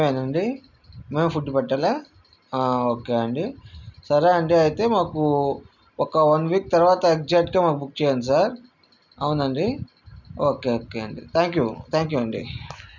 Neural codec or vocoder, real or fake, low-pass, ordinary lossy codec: none; real; 7.2 kHz; none